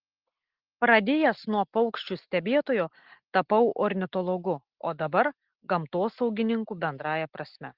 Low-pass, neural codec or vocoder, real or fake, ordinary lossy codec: 5.4 kHz; none; real; Opus, 16 kbps